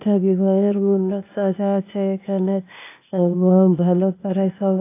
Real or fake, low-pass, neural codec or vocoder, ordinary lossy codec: fake; 3.6 kHz; codec, 16 kHz, 0.8 kbps, ZipCodec; AAC, 24 kbps